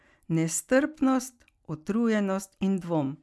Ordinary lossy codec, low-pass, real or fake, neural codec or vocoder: none; none; real; none